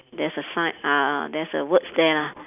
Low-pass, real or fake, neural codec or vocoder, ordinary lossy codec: 3.6 kHz; real; none; none